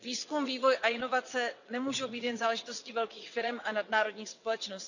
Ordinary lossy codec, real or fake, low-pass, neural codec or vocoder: none; fake; 7.2 kHz; vocoder, 22.05 kHz, 80 mel bands, WaveNeXt